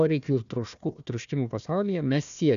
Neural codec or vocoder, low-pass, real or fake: codec, 16 kHz, 1 kbps, FunCodec, trained on Chinese and English, 50 frames a second; 7.2 kHz; fake